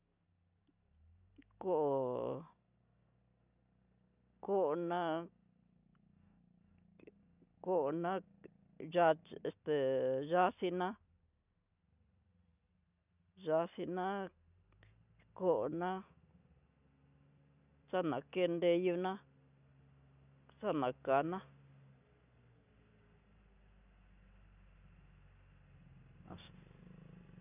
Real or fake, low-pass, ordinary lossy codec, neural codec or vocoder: real; 3.6 kHz; none; none